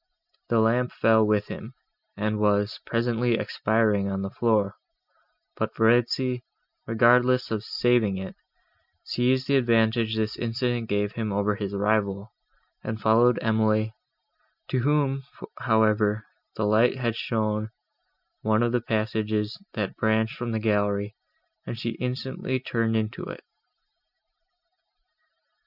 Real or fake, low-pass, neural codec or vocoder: real; 5.4 kHz; none